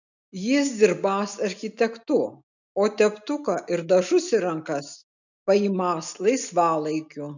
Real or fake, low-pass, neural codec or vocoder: real; 7.2 kHz; none